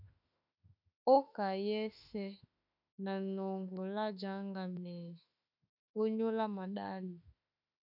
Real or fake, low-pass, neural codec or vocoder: fake; 5.4 kHz; autoencoder, 48 kHz, 32 numbers a frame, DAC-VAE, trained on Japanese speech